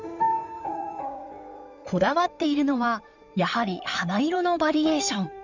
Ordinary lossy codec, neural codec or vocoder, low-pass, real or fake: none; codec, 16 kHz in and 24 kHz out, 2.2 kbps, FireRedTTS-2 codec; 7.2 kHz; fake